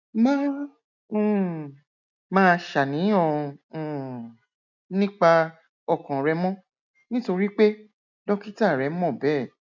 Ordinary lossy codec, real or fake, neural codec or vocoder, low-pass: none; real; none; 7.2 kHz